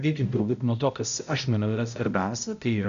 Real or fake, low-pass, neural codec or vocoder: fake; 7.2 kHz; codec, 16 kHz, 0.5 kbps, X-Codec, HuBERT features, trained on balanced general audio